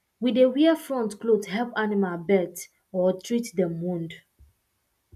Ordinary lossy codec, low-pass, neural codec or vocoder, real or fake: none; 14.4 kHz; none; real